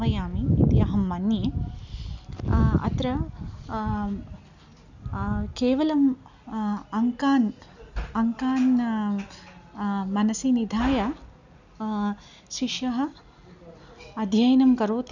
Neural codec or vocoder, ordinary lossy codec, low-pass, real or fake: none; none; 7.2 kHz; real